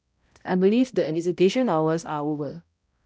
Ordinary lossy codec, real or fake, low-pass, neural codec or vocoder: none; fake; none; codec, 16 kHz, 0.5 kbps, X-Codec, HuBERT features, trained on balanced general audio